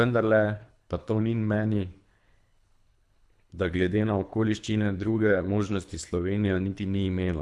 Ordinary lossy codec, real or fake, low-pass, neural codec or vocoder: none; fake; none; codec, 24 kHz, 3 kbps, HILCodec